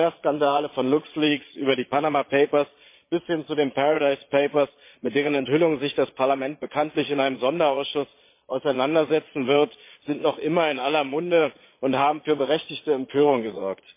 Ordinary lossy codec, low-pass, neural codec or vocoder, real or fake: MP3, 24 kbps; 3.6 kHz; vocoder, 22.05 kHz, 80 mel bands, Vocos; fake